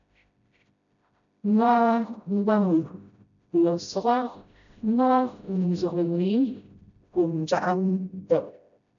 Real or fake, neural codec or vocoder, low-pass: fake; codec, 16 kHz, 0.5 kbps, FreqCodec, smaller model; 7.2 kHz